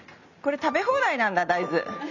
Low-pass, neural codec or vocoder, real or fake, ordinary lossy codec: 7.2 kHz; none; real; none